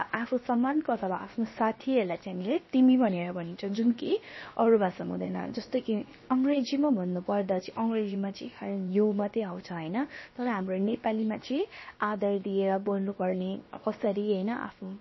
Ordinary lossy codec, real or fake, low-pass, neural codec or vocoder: MP3, 24 kbps; fake; 7.2 kHz; codec, 16 kHz, about 1 kbps, DyCAST, with the encoder's durations